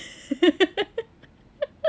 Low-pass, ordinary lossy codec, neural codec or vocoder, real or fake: none; none; none; real